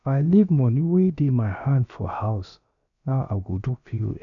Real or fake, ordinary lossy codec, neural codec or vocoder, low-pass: fake; none; codec, 16 kHz, about 1 kbps, DyCAST, with the encoder's durations; 7.2 kHz